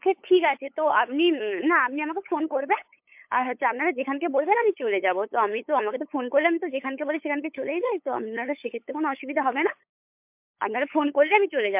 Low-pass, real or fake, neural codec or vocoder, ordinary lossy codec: 3.6 kHz; fake; codec, 16 kHz, 8 kbps, FunCodec, trained on LibriTTS, 25 frames a second; MP3, 32 kbps